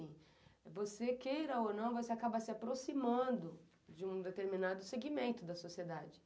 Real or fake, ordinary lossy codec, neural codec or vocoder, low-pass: real; none; none; none